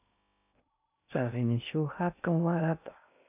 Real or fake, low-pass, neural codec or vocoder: fake; 3.6 kHz; codec, 16 kHz in and 24 kHz out, 0.6 kbps, FocalCodec, streaming, 2048 codes